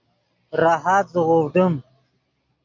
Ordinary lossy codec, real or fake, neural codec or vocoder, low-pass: MP3, 48 kbps; real; none; 7.2 kHz